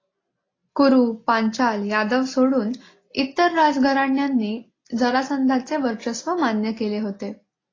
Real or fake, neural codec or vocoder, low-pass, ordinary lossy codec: real; none; 7.2 kHz; AAC, 32 kbps